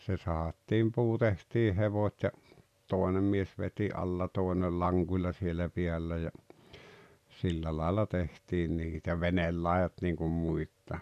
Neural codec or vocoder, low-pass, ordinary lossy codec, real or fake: none; 14.4 kHz; none; real